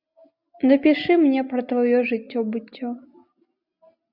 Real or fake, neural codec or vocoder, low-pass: real; none; 5.4 kHz